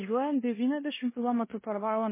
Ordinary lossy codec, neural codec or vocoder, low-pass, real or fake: MP3, 16 kbps; codec, 16 kHz in and 24 kHz out, 0.9 kbps, LongCat-Audio-Codec, four codebook decoder; 3.6 kHz; fake